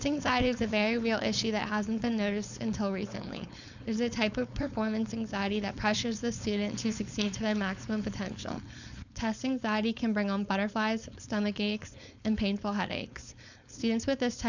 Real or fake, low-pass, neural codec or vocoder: fake; 7.2 kHz; codec, 16 kHz, 4.8 kbps, FACodec